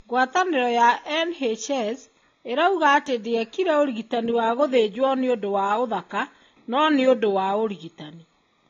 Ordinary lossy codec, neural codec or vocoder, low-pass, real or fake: AAC, 32 kbps; none; 7.2 kHz; real